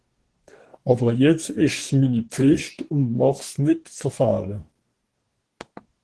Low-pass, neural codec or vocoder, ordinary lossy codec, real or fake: 10.8 kHz; codec, 44.1 kHz, 2.6 kbps, DAC; Opus, 16 kbps; fake